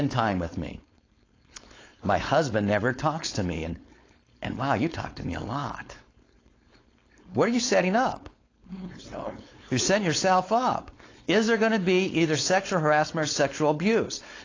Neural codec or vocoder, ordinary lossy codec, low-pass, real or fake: codec, 16 kHz, 4.8 kbps, FACodec; AAC, 32 kbps; 7.2 kHz; fake